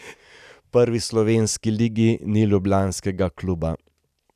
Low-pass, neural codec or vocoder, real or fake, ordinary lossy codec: 14.4 kHz; none; real; none